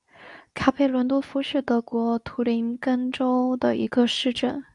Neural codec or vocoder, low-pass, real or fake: codec, 24 kHz, 0.9 kbps, WavTokenizer, medium speech release version 2; 10.8 kHz; fake